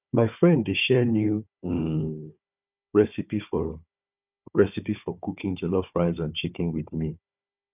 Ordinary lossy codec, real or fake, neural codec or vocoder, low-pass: none; fake; codec, 16 kHz, 4 kbps, FunCodec, trained on Chinese and English, 50 frames a second; 3.6 kHz